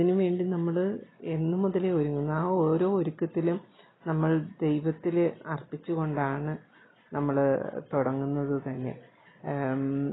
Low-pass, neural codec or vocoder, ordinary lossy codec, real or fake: 7.2 kHz; none; AAC, 16 kbps; real